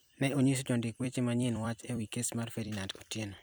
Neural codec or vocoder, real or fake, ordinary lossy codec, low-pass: vocoder, 44.1 kHz, 128 mel bands every 256 samples, BigVGAN v2; fake; none; none